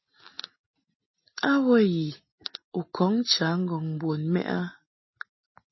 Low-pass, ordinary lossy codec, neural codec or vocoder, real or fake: 7.2 kHz; MP3, 24 kbps; none; real